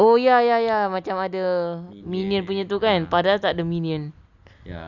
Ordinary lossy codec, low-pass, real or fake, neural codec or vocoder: none; 7.2 kHz; real; none